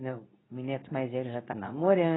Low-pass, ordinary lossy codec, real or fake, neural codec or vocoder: 7.2 kHz; AAC, 16 kbps; fake; codec, 24 kHz, 0.9 kbps, WavTokenizer, medium speech release version 2